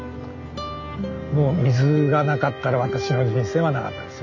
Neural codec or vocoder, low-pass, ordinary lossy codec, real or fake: none; 7.2 kHz; none; real